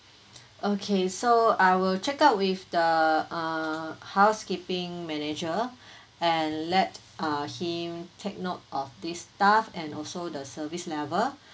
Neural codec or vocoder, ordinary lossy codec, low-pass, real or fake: none; none; none; real